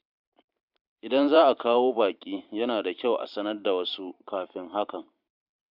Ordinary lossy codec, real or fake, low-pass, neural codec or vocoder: none; fake; 5.4 kHz; vocoder, 44.1 kHz, 128 mel bands every 512 samples, BigVGAN v2